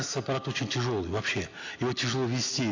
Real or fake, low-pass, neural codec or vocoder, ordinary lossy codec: real; 7.2 kHz; none; AAC, 48 kbps